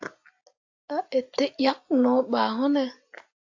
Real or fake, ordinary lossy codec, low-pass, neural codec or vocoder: real; AAC, 48 kbps; 7.2 kHz; none